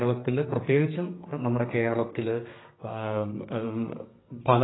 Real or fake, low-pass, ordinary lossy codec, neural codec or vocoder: fake; 7.2 kHz; AAC, 16 kbps; codec, 32 kHz, 1.9 kbps, SNAC